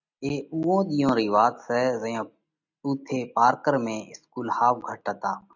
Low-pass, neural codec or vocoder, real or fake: 7.2 kHz; none; real